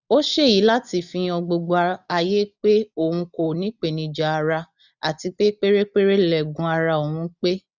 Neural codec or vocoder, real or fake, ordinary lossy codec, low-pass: none; real; none; 7.2 kHz